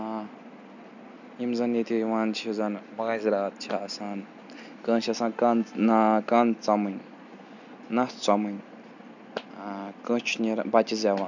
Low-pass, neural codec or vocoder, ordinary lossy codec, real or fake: 7.2 kHz; none; none; real